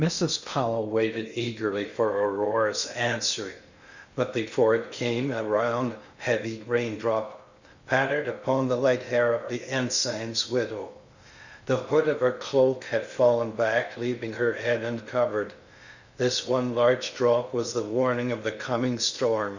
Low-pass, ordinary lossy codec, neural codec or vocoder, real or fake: 7.2 kHz; Opus, 64 kbps; codec, 16 kHz in and 24 kHz out, 0.6 kbps, FocalCodec, streaming, 2048 codes; fake